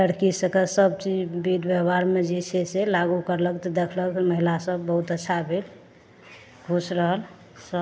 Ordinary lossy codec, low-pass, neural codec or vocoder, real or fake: none; none; none; real